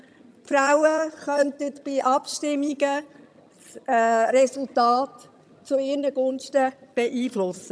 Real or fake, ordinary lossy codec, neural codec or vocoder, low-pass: fake; none; vocoder, 22.05 kHz, 80 mel bands, HiFi-GAN; none